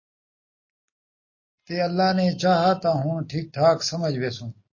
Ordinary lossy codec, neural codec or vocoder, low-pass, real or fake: MP3, 32 kbps; none; 7.2 kHz; real